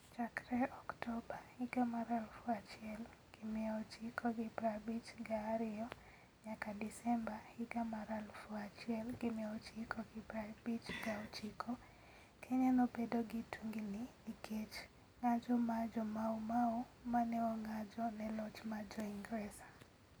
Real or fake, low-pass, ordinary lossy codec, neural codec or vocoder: real; none; none; none